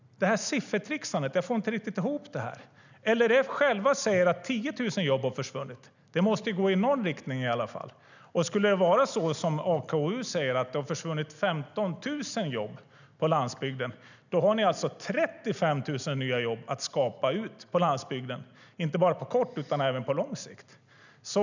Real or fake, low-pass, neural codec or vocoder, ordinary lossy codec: real; 7.2 kHz; none; none